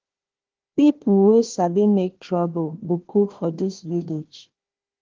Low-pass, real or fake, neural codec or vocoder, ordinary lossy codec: 7.2 kHz; fake; codec, 16 kHz, 1 kbps, FunCodec, trained on Chinese and English, 50 frames a second; Opus, 16 kbps